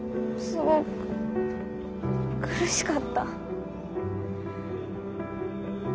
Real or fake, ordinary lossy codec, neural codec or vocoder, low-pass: real; none; none; none